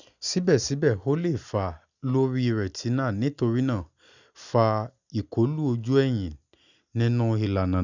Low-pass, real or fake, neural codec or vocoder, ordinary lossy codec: 7.2 kHz; real; none; none